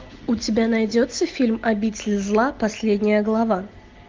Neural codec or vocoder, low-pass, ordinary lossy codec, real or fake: none; 7.2 kHz; Opus, 24 kbps; real